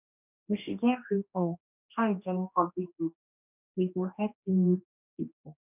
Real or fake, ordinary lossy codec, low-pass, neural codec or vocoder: fake; none; 3.6 kHz; codec, 16 kHz, 1 kbps, X-Codec, HuBERT features, trained on general audio